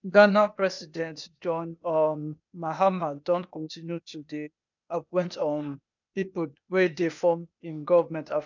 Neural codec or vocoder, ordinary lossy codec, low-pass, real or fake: codec, 16 kHz, 0.8 kbps, ZipCodec; none; 7.2 kHz; fake